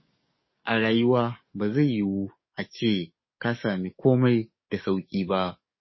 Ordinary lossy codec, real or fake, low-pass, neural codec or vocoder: MP3, 24 kbps; fake; 7.2 kHz; codec, 44.1 kHz, 7.8 kbps, DAC